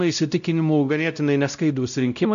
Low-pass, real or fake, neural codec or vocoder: 7.2 kHz; fake; codec, 16 kHz, 0.5 kbps, X-Codec, WavLM features, trained on Multilingual LibriSpeech